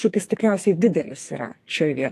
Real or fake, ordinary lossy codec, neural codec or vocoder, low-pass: fake; AAC, 64 kbps; codec, 44.1 kHz, 2.6 kbps, SNAC; 14.4 kHz